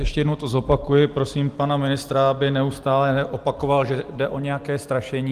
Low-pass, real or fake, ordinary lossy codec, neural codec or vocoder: 14.4 kHz; real; Opus, 24 kbps; none